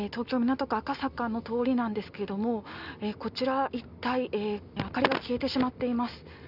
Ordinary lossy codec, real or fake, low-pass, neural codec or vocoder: none; real; 5.4 kHz; none